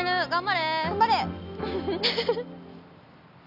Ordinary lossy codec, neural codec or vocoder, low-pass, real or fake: none; none; 5.4 kHz; real